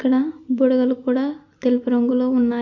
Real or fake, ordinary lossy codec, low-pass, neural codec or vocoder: real; AAC, 48 kbps; 7.2 kHz; none